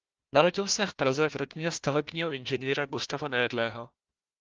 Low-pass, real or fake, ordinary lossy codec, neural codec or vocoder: 7.2 kHz; fake; Opus, 24 kbps; codec, 16 kHz, 1 kbps, FunCodec, trained on Chinese and English, 50 frames a second